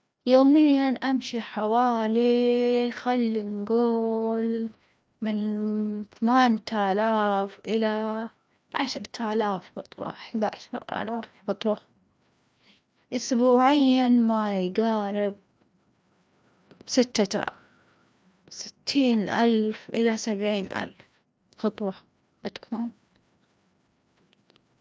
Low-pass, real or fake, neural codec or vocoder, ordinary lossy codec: none; fake; codec, 16 kHz, 1 kbps, FreqCodec, larger model; none